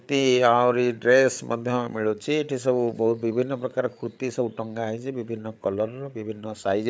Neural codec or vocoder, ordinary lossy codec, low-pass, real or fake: codec, 16 kHz, 8 kbps, FreqCodec, larger model; none; none; fake